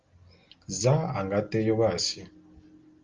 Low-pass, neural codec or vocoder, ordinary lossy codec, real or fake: 7.2 kHz; none; Opus, 32 kbps; real